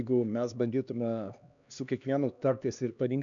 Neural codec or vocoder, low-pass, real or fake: codec, 16 kHz, 2 kbps, X-Codec, HuBERT features, trained on LibriSpeech; 7.2 kHz; fake